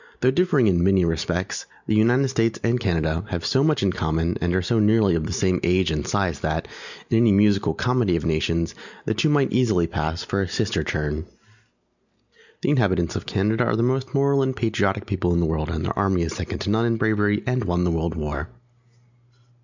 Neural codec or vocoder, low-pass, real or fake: none; 7.2 kHz; real